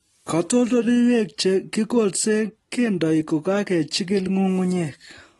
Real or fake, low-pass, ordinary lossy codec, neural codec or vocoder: real; 19.8 kHz; AAC, 32 kbps; none